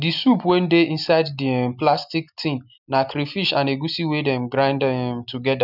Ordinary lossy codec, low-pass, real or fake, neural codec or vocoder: none; 5.4 kHz; real; none